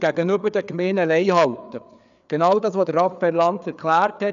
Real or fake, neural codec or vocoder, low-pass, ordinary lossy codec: fake; codec, 16 kHz, 4 kbps, FreqCodec, larger model; 7.2 kHz; none